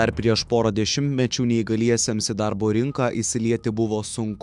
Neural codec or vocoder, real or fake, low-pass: autoencoder, 48 kHz, 128 numbers a frame, DAC-VAE, trained on Japanese speech; fake; 10.8 kHz